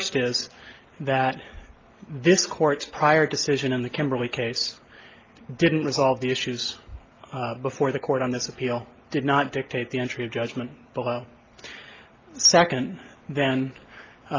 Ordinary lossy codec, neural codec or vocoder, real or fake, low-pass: Opus, 24 kbps; none; real; 7.2 kHz